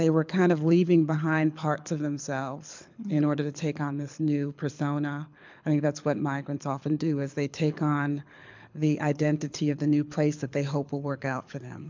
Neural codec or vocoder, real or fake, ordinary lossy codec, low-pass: codec, 24 kHz, 6 kbps, HILCodec; fake; MP3, 64 kbps; 7.2 kHz